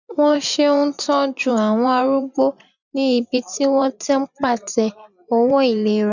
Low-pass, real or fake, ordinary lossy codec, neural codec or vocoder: 7.2 kHz; fake; none; vocoder, 24 kHz, 100 mel bands, Vocos